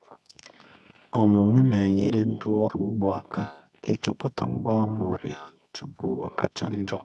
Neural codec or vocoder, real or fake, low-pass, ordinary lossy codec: codec, 24 kHz, 0.9 kbps, WavTokenizer, medium music audio release; fake; none; none